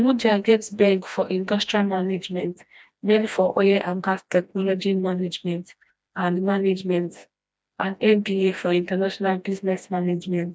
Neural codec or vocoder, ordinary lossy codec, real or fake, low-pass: codec, 16 kHz, 1 kbps, FreqCodec, smaller model; none; fake; none